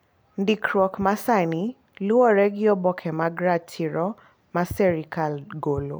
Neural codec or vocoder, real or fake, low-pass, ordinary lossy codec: none; real; none; none